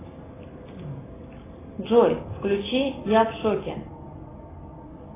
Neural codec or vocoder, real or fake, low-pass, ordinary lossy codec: none; real; 3.6 kHz; AAC, 16 kbps